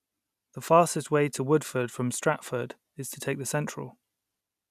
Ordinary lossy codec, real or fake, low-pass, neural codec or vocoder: none; real; 14.4 kHz; none